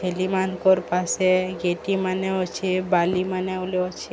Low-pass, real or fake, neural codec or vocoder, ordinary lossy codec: none; real; none; none